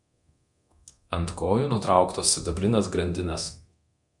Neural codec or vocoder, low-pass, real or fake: codec, 24 kHz, 0.9 kbps, DualCodec; 10.8 kHz; fake